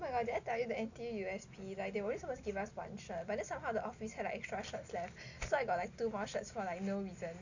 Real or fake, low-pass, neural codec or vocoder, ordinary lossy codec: real; 7.2 kHz; none; none